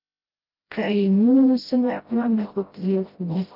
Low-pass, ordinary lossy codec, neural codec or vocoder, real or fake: 5.4 kHz; Opus, 32 kbps; codec, 16 kHz, 0.5 kbps, FreqCodec, smaller model; fake